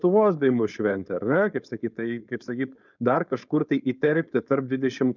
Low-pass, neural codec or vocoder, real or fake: 7.2 kHz; codec, 16 kHz, 4.8 kbps, FACodec; fake